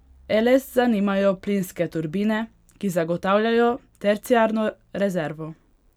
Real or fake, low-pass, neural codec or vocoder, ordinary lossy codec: real; 19.8 kHz; none; none